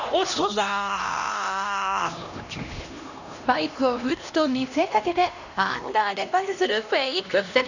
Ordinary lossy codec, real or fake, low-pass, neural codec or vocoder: none; fake; 7.2 kHz; codec, 16 kHz, 1 kbps, X-Codec, HuBERT features, trained on LibriSpeech